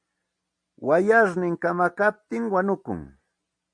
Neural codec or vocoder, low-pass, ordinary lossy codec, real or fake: none; 9.9 kHz; MP3, 48 kbps; real